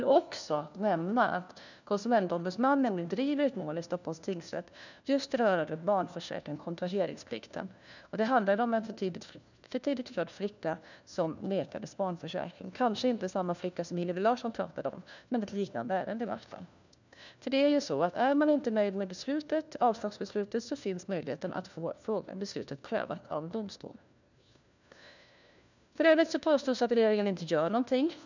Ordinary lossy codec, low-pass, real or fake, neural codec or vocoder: none; 7.2 kHz; fake; codec, 16 kHz, 1 kbps, FunCodec, trained on LibriTTS, 50 frames a second